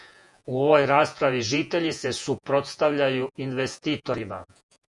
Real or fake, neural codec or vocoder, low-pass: fake; vocoder, 48 kHz, 128 mel bands, Vocos; 10.8 kHz